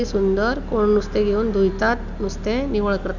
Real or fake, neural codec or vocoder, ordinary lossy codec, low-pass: real; none; none; 7.2 kHz